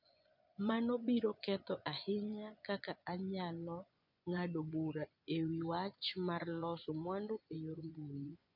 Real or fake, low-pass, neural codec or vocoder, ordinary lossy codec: fake; 5.4 kHz; vocoder, 24 kHz, 100 mel bands, Vocos; none